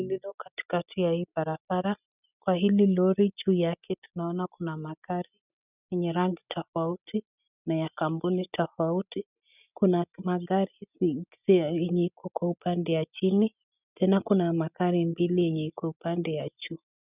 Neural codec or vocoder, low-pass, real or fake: none; 3.6 kHz; real